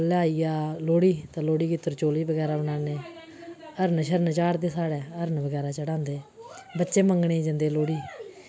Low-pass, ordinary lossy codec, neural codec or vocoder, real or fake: none; none; none; real